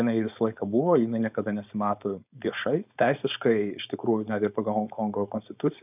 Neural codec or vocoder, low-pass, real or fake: codec, 16 kHz, 4.8 kbps, FACodec; 3.6 kHz; fake